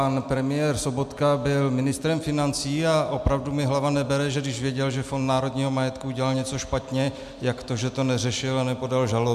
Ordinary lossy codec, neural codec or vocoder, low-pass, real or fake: MP3, 96 kbps; none; 14.4 kHz; real